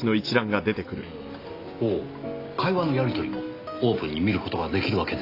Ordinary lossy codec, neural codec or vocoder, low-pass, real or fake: AAC, 32 kbps; none; 5.4 kHz; real